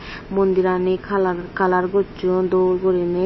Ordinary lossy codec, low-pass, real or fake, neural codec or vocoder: MP3, 24 kbps; 7.2 kHz; fake; autoencoder, 48 kHz, 128 numbers a frame, DAC-VAE, trained on Japanese speech